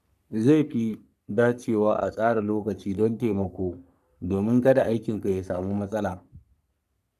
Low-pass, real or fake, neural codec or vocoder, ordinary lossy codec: 14.4 kHz; fake; codec, 44.1 kHz, 3.4 kbps, Pupu-Codec; none